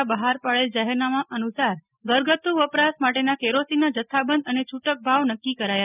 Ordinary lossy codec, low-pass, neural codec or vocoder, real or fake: none; 3.6 kHz; none; real